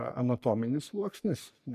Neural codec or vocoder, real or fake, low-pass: codec, 44.1 kHz, 3.4 kbps, Pupu-Codec; fake; 14.4 kHz